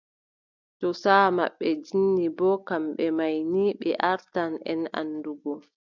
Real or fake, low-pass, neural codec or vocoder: real; 7.2 kHz; none